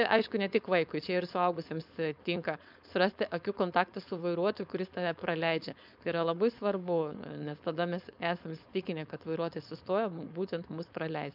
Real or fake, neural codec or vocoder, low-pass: fake; codec, 16 kHz, 4.8 kbps, FACodec; 5.4 kHz